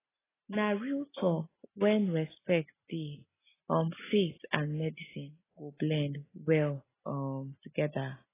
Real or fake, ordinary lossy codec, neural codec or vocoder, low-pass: real; AAC, 16 kbps; none; 3.6 kHz